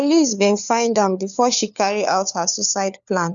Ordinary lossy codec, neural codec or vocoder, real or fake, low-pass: none; codec, 16 kHz, 4 kbps, FunCodec, trained on LibriTTS, 50 frames a second; fake; 7.2 kHz